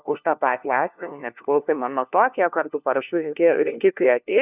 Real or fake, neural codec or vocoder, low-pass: fake; codec, 16 kHz, 1 kbps, FunCodec, trained on LibriTTS, 50 frames a second; 3.6 kHz